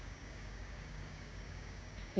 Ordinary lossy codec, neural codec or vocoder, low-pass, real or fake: none; codec, 16 kHz, 6 kbps, DAC; none; fake